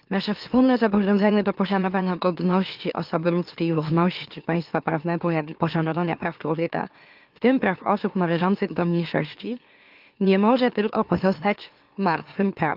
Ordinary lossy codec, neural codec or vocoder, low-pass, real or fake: Opus, 32 kbps; autoencoder, 44.1 kHz, a latent of 192 numbers a frame, MeloTTS; 5.4 kHz; fake